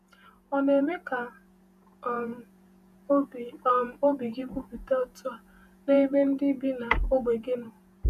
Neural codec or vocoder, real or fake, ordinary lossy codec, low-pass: vocoder, 48 kHz, 128 mel bands, Vocos; fake; none; 14.4 kHz